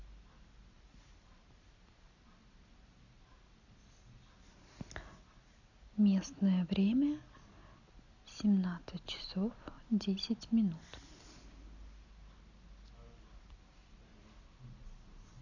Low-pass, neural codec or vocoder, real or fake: 7.2 kHz; none; real